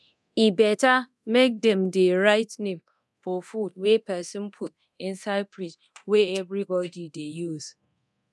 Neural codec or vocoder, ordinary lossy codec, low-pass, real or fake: codec, 24 kHz, 0.9 kbps, DualCodec; none; none; fake